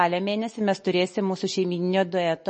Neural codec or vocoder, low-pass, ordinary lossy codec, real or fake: none; 10.8 kHz; MP3, 32 kbps; real